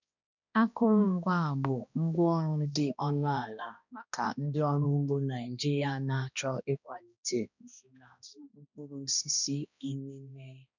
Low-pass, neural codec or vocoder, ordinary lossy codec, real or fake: 7.2 kHz; codec, 16 kHz, 1 kbps, X-Codec, HuBERT features, trained on balanced general audio; none; fake